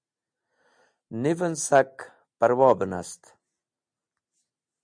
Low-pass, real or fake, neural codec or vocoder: 9.9 kHz; real; none